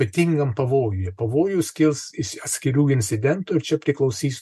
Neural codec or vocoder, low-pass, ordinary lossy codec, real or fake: none; 14.4 kHz; MP3, 64 kbps; real